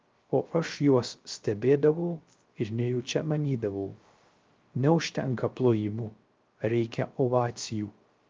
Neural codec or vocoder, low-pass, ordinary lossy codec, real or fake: codec, 16 kHz, 0.3 kbps, FocalCodec; 7.2 kHz; Opus, 24 kbps; fake